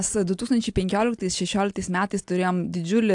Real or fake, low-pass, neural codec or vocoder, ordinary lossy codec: real; 10.8 kHz; none; AAC, 64 kbps